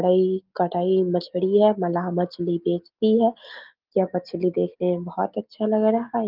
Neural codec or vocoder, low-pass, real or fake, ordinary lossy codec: none; 5.4 kHz; real; Opus, 32 kbps